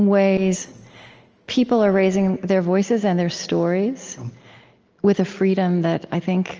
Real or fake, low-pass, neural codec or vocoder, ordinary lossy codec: real; 7.2 kHz; none; Opus, 24 kbps